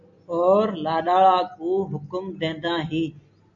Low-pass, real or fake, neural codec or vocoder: 7.2 kHz; real; none